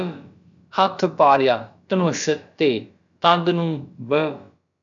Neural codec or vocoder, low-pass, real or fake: codec, 16 kHz, about 1 kbps, DyCAST, with the encoder's durations; 7.2 kHz; fake